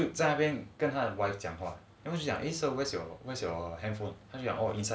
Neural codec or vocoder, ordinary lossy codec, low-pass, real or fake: none; none; none; real